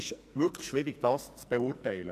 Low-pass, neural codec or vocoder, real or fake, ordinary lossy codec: 14.4 kHz; codec, 44.1 kHz, 2.6 kbps, SNAC; fake; none